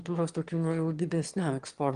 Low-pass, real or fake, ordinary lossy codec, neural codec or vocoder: 9.9 kHz; fake; Opus, 24 kbps; autoencoder, 22.05 kHz, a latent of 192 numbers a frame, VITS, trained on one speaker